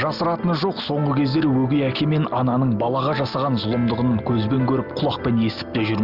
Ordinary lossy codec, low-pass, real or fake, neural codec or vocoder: Opus, 32 kbps; 5.4 kHz; real; none